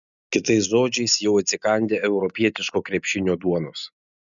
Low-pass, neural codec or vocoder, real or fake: 7.2 kHz; none; real